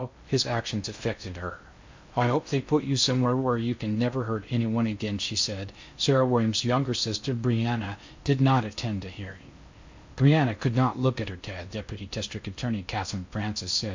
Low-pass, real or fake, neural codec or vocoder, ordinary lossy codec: 7.2 kHz; fake; codec, 16 kHz in and 24 kHz out, 0.6 kbps, FocalCodec, streaming, 2048 codes; MP3, 64 kbps